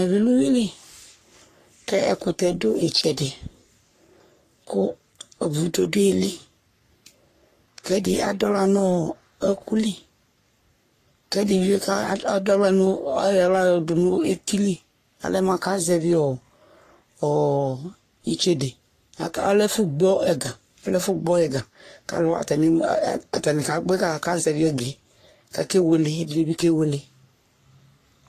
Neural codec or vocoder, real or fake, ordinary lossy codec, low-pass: codec, 44.1 kHz, 3.4 kbps, Pupu-Codec; fake; AAC, 48 kbps; 14.4 kHz